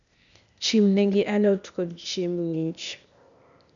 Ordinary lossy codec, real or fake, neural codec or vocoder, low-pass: none; fake; codec, 16 kHz, 0.8 kbps, ZipCodec; 7.2 kHz